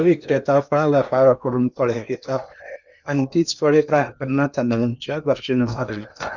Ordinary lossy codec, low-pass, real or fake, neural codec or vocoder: none; 7.2 kHz; fake; codec, 16 kHz in and 24 kHz out, 0.8 kbps, FocalCodec, streaming, 65536 codes